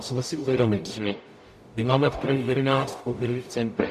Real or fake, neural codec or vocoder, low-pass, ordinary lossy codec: fake; codec, 44.1 kHz, 0.9 kbps, DAC; 14.4 kHz; MP3, 64 kbps